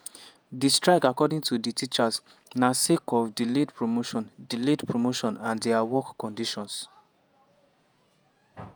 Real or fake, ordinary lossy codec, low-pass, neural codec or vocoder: fake; none; none; vocoder, 48 kHz, 128 mel bands, Vocos